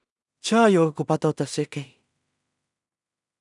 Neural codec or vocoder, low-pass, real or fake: codec, 16 kHz in and 24 kHz out, 0.4 kbps, LongCat-Audio-Codec, two codebook decoder; 10.8 kHz; fake